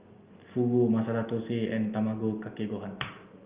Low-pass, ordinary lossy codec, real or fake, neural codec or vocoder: 3.6 kHz; Opus, 24 kbps; real; none